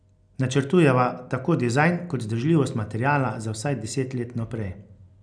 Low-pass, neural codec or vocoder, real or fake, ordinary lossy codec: 9.9 kHz; none; real; none